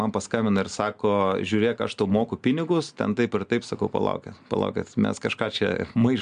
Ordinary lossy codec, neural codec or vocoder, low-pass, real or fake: AAC, 96 kbps; none; 9.9 kHz; real